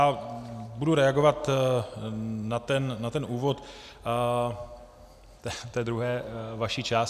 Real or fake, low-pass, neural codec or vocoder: real; 14.4 kHz; none